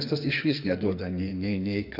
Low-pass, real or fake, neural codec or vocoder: 5.4 kHz; fake; codec, 44.1 kHz, 2.6 kbps, SNAC